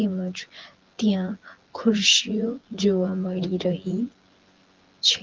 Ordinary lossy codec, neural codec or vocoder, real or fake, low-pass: Opus, 16 kbps; vocoder, 24 kHz, 100 mel bands, Vocos; fake; 7.2 kHz